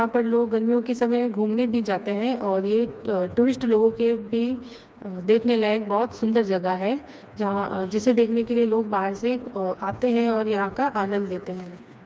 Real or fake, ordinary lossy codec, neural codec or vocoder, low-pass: fake; none; codec, 16 kHz, 2 kbps, FreqCodec, smaller model; none